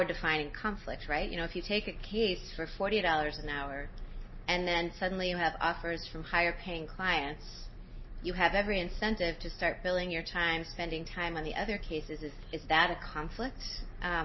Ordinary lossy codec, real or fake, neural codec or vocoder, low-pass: MP3, 24 kbps; real; none; 7.2 kHz